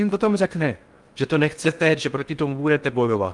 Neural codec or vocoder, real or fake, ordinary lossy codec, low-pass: codec, 16 kHz in and 24 kHz out, 0.6 kbps, FocalCodec, streaming, 4096 codes; fake; Opus, 32 kbps; 10.8 kHz